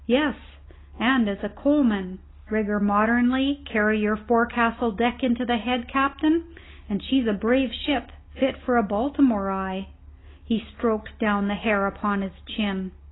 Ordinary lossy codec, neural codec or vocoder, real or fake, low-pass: AAC, 16 kbps; none; real; 7.2 kHz